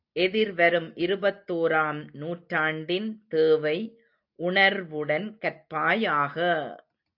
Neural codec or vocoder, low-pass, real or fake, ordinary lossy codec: none; 5.4 kHz; real; AAC, 48 kbps